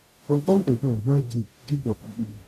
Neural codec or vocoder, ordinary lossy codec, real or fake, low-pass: codec, 44.1 kHz, 0.9 kbps, DAC; none; fake; 14.4 kHz